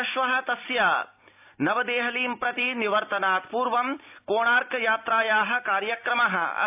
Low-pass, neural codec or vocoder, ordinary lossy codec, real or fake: 3.6 kHz; none; AAC, 32 kbps; real